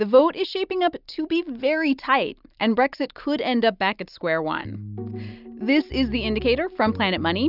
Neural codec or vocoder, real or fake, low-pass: none; real; 5.4 kHz